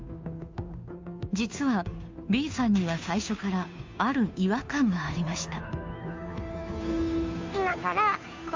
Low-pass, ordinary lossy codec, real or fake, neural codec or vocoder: 7.2 kHz; MP3, 64 kbps; fake; codec, 16 kHz, 2 kbps, FunCodec, trained on Chinese and English, 25 frames a second